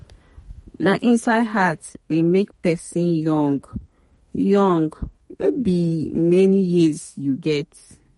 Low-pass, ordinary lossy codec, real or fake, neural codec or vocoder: 14.4 kHz; MP3, 48 kbps; fake; codec, 32 kHz, 1.9 kbps, SNAC